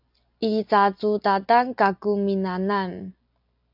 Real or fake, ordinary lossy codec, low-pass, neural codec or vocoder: real; AAC, 48 kbps; 5.4 kHz; none